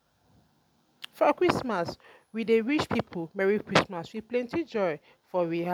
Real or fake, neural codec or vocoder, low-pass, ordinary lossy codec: real; none; 19.8 kHz; none